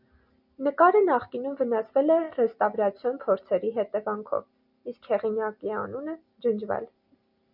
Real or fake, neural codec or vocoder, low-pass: real; none; 5.4 kHz